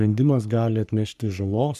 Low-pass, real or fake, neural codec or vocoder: 14.4 kHz; fake; codec, 44.1 kHz, 3.4 kbps, Pupu-Codec